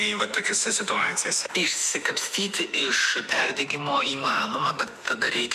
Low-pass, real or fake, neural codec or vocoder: 14.4 kHz; fake; autoencoder, 48 kHz, 32 numbers a frame, DAC-VAE, trained on Japanese speech